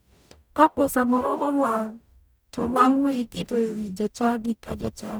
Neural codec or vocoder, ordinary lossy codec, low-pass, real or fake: codec, 44.1 kHz, 0.9 kbps, DAC; none; none; fake